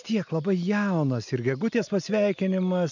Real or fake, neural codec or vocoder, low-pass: real; none; 7.2 kHz